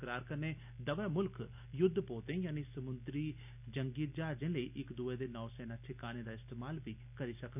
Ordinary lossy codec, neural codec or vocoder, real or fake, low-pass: none; none; real; 3.6 kHz